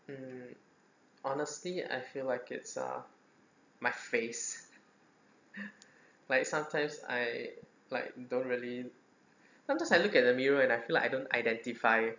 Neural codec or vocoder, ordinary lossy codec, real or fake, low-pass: none; none; real; 7.2 kHz